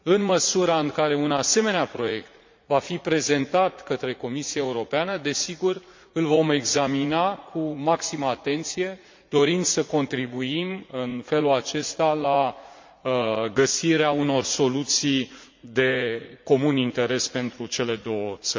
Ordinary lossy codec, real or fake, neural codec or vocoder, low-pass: MP3, 48 kbps; fake; vocoder, 44.1 kHz, 80 mel bands, Vocos; 7.2 kHz